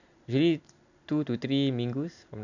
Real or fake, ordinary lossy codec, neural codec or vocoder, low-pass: real; none; none; 7.2 kHz